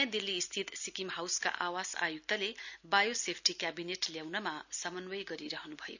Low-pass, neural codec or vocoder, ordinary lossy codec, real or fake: 7.2 kHz; none; none; real